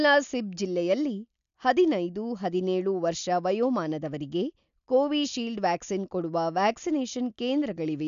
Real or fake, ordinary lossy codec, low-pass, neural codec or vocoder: real; none; 7.2 kHz; none